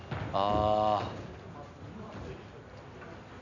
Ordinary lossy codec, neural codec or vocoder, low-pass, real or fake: none; none; 7.2 kHz; real